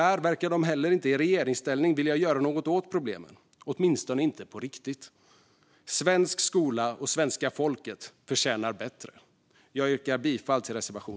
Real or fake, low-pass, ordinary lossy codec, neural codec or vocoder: real; none; none; none